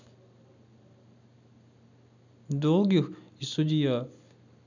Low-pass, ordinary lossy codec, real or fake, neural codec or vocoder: 7.2 kHz; none; real; none